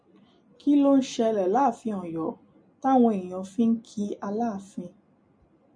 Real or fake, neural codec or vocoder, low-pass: real; none; 9.9 kHz